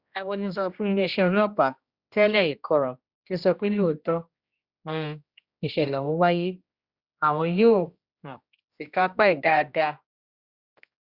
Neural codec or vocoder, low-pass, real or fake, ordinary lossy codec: codec, 16 kHz, 1 kbps, X-Codec, HuBERT features, trained on general audio; 5.4 kHz; fake; Opus, 64 kbps